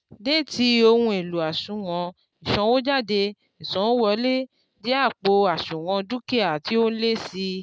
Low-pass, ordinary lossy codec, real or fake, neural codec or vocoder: none; none; real; none